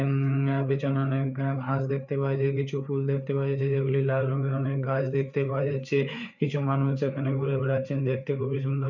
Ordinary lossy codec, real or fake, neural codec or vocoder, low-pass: none; fake; codec, 16 kHz, 4 kbps, FreqCodec, larger model; 7.2 kHz